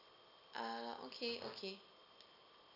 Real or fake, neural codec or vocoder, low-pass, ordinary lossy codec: real; none; 5.4 kHz; none